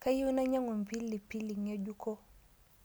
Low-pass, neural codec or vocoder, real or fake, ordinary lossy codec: none; none; real; none